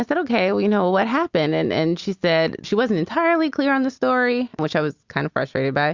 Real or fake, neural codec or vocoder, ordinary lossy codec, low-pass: real; none; Opus, 64 kbps; 7.2 kHz